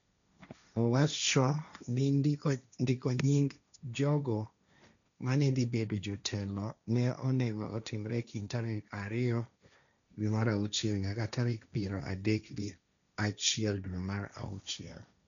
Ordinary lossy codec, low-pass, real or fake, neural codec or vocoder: none; 7.2 kHz; fake; codec, 16 kHz, 1.1 kbps, Voila-Tokenizer